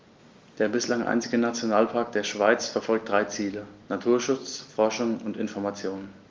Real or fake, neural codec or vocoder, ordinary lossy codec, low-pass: real; none; Opus, 32 kbps; 7.2 kHz